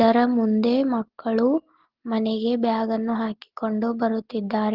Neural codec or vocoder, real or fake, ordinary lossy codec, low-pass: none; real; Opus, 16 kbps; 5.4 kHz